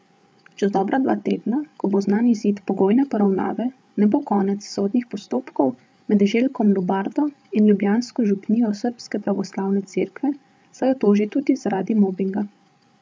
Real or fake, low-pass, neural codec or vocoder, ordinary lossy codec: fake; none; codec, 16 kHz, 8 kbps, FreqCodec, larger model; none